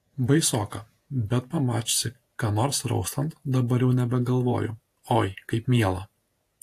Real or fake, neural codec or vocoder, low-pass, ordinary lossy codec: fake; vocoder, 48 kHz, 128 mel bands, Vocos; 14.4 kHz; AAC, 64 kbps